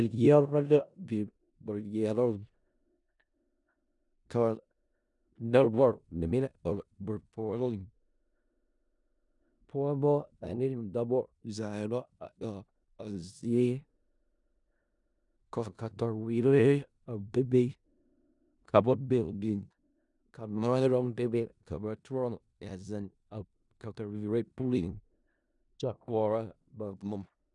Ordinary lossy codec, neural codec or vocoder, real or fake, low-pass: MP3, 96 kbps; codec, 16 kHz in and 24 kHz out, 0.4 kbps, LongCat-Audio-Codec, four codebook decoder; fake; 10.8 kHz